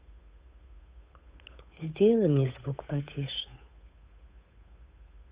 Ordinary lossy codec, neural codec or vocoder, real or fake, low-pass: Opus, 64 kbps; codec, 16 kHz, 8 kbps, FunCodec, trained on Chinese and English, 25 frames a second; fake; 3.6 kHz